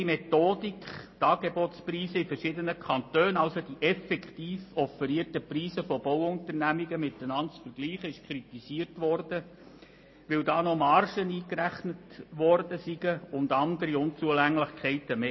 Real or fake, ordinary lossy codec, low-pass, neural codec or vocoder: real; MP3, 24 kbps; 7.2 kHz; none